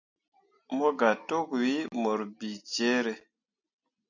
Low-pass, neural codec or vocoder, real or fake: 7.2 kHz; none; real